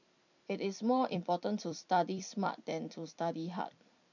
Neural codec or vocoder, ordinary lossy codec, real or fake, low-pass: vocoder, 44.1 kHz, 128 mel bands every 256 samples, BigVGAN v2; none; fake; 7.2 kHz